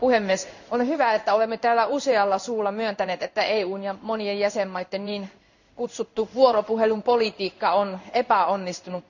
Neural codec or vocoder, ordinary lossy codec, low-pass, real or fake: codec, 16 kHz in and 24 kHz out, 1 kbps, XY-Tokenizer; none; 7.2 kHz; fake